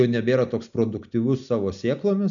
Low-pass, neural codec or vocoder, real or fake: 7.2 kHz; none; real